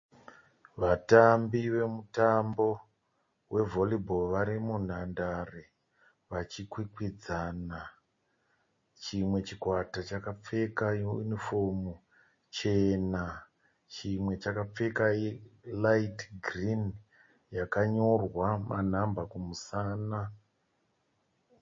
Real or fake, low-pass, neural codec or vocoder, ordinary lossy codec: real; 7.2 kHz; none; MP3, 32 kbps